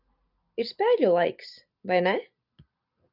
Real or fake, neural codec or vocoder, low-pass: real; none; 5.4 kHz